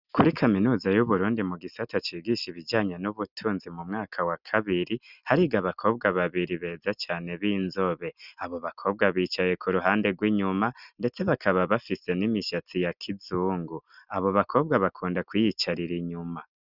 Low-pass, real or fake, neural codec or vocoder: 5.4 kHz; real; none